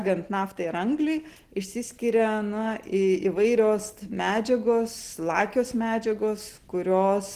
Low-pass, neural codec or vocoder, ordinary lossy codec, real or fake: 14.4 kHz; none; Opus, 16 kbps; real